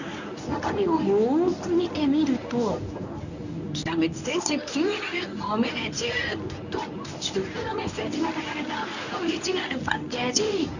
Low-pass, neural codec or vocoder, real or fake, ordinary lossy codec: 7.2 kHz; codec, 24 kHz, 0.9 kbps, WavTokenizer, medium speech release version 1; fake; none